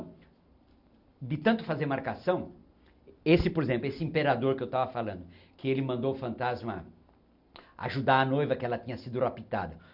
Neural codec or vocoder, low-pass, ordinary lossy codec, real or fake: none; 5.4 kHz; none; real